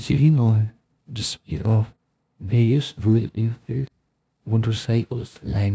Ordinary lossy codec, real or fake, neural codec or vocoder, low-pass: none; fake; codec, 16 kHz, 0.5 kbps, FunCodec, trained on LibriTTS, 25 frames a second; none